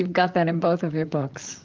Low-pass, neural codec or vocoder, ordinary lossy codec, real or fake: 7.2 kHz; vocoder, 44.1 kHz, 128 mel bands, Pupu-Vocoder; Opus, 32 kbps; fake